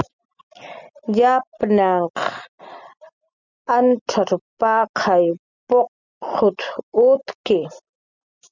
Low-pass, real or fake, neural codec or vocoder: 7.2 kHz; real; none